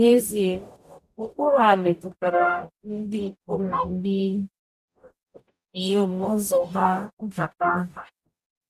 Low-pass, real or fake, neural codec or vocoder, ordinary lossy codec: 14.4 kHz; fake; codec, 44.1 kHz, 0.9 kbps, DAC; none